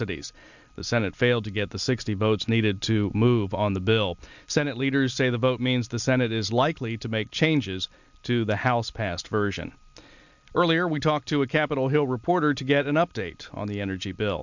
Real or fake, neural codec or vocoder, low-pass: real; none; 7.2 kHz